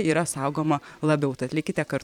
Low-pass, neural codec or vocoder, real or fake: 19.8 kHz; vocoder, 44.1 kHz, 128 mel bands every 512 samples, BigVGAN v2; fake